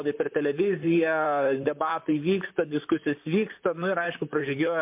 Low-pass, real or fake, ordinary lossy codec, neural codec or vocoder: 3.6 kHz; fake; MP3, 32 kbps; vocoder, 44.1 kHz, 128 mel bands, Pupu-Vocoder